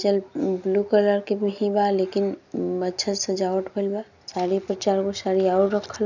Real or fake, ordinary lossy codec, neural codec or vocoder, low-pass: real; none; none; 7.2 kHz